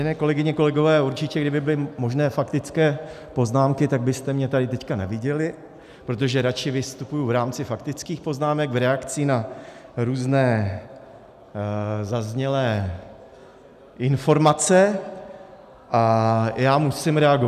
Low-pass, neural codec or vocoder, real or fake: 14.4 kHz; none; real